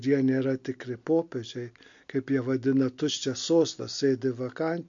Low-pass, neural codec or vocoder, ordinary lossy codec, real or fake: 7.2 kHz; none; MP3, 48 kbps; real